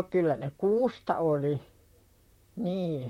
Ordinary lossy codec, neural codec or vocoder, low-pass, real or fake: MP3, 64 kbps; vocoder, 44.1 kHz, 128 mel bands, Pupu-Vocoder; 19.8 kHz; fake